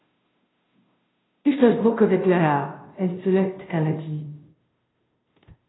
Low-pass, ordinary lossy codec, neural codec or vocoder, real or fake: 7.2 kHz; AAC, 16 kbps; codec, 16 kHz, 0.5 kbps, FunCodec, trained on Chinese and English, 25 frames a second; fake